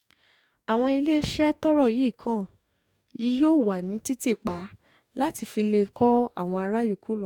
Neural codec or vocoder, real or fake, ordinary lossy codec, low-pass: codec, 44.1 kHz, 2.6 kbps, DAC; fake; none; 19.8 kHz